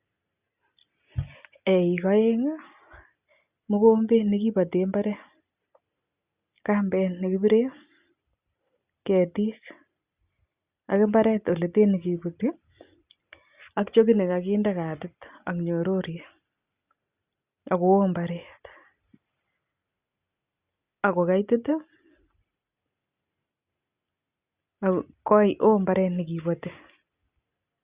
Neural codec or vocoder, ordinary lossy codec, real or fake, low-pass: none; Opus, 64 kbps; real; 3.6 kHz